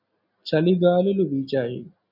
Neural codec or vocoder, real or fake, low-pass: none; real; 5.4 kHz